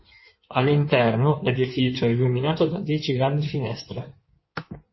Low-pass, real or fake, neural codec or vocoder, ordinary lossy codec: 7.2 kHz; fake; codec, 16 kHz in and 24 kHz out, 1.1 kbps, FireRedTTS-2 codec; MP3, 24 kbps